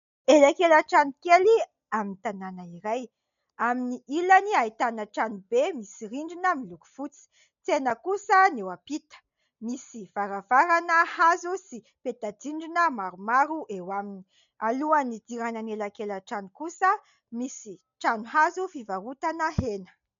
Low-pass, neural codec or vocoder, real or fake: 7.2 kHz; none; real